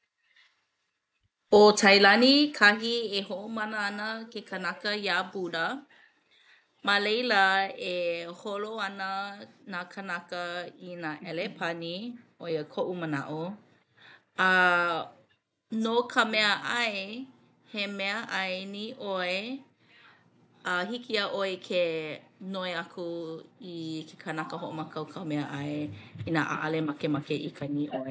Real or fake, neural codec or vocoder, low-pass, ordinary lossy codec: real; none; none; none